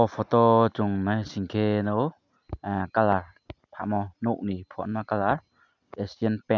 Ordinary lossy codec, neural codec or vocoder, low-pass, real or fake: none; none; 7.2 kHz; real